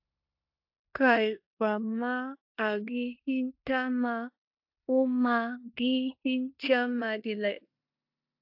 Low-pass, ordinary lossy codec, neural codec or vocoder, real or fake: 5.4 kHz; AAC, 48 kbps; codec, 16 kHz in and 24 kHz out, 0.9 kbps, LongCat-Audio-Codec, four codebook decoder; fake